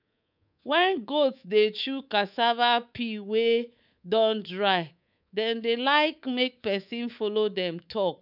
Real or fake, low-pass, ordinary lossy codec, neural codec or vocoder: fake; 5.4 kHz; none; codec, 24 kHz, 3.1 kbps, DualCodec